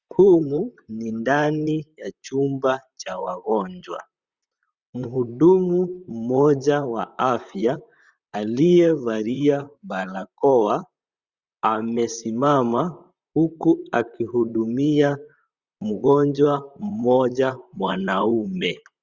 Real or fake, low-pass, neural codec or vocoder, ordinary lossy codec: fake; 7.2 kHz; vocoder, 44.1 kHz, 128 mel bands, Pupu-Vocoder; Opus, 64 kbps